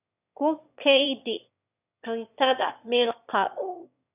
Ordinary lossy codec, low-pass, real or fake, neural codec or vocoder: none; 3.6 kHz; fake; autoencoder, 22.05 kHz, a latent of 192 numbers a frame, VITS, trained on one speaker